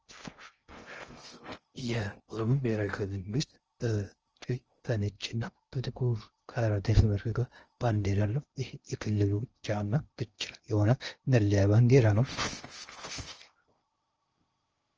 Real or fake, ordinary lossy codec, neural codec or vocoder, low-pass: fake; Opus, 24 kbps; codec, 16 kHz in and 24 kHz out, 0.6 kbps, FocalCodec, streaming, 2048 codes; 7.2 kHz